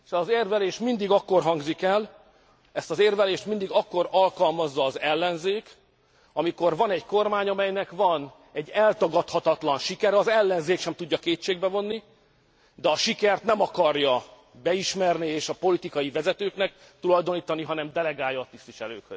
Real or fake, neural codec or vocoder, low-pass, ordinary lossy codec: real; none; none; none